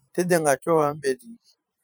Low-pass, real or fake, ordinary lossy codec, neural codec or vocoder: none; fake; none; vocoder, 44.1 kHz, 128 mel bands every 512 samples, BigVGAN v2